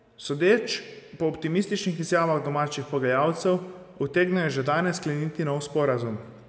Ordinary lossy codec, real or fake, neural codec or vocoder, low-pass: none; real; none; none